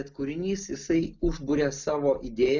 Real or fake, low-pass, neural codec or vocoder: real; 7.2 kHz; none